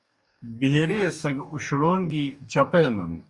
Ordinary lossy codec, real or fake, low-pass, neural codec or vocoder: Opus, 64 kbps; fake; 10.8 kHz; codec, 44.1 kHz, 2.6 kbps, DAC